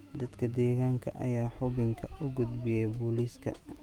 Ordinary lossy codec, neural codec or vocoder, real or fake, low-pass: Opus, 32 kbps; autoencoder, 48 kHz, 128 numbers a frame, DAC-VAE, trained on Japanese speech; fake; 19.8 kHz